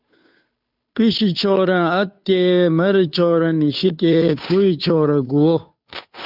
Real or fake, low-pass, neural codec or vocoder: fake; 5.4 kHz; codec, 16 kHz, 2 kbps, FunCodec, trained on Chinese and English, 25 frames a second